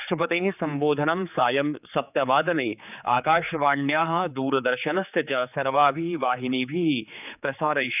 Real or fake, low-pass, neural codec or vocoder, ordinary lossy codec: fake; 3.6 kHz; codec, 16 kHz, 4 kbps, X-Codec, HuBERT features, trained on general audio; none